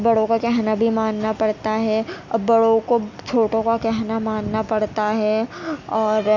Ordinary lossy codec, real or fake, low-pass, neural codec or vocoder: none; real; 7.2 kHz; none